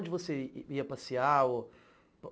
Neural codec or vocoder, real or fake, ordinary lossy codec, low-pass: none; real; none; none